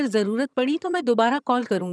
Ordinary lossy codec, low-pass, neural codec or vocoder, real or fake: none; none; vocoder, 22.05 kHz, 80 mel bands, HiFi-GAN; fake